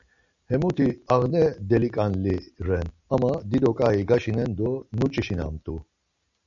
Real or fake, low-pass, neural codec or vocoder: real; 7.2 kHz; none